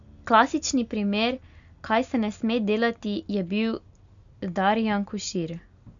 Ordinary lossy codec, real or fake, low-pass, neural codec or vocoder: none; real; 7.2 kHz; none